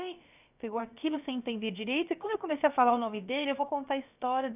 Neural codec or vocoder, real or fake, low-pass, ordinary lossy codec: codec, 16 kHz, about 1 kbps, DyCAST, with the encoder's durations; fake; 3.6 kHz; none